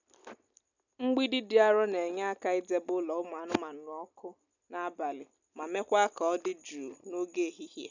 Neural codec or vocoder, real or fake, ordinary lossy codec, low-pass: none; real; none; 7.2 kHz